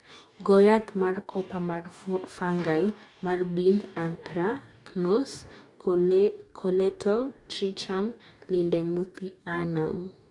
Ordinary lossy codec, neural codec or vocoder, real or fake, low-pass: none; codec, 44.1 kHz, 2.6 kbps, DAC; fake; 10.8 kHz